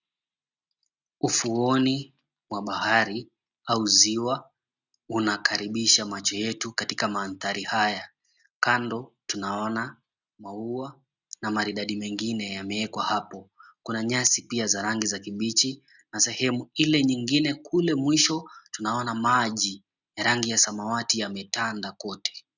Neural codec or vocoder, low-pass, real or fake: none; 7.2 kHz; real